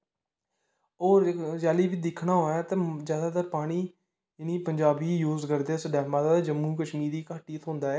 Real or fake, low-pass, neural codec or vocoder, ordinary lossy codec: real; none; none; none